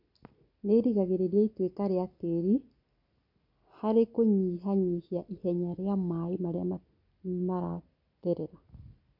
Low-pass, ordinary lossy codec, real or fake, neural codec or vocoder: 5.4 kHz; none; real; none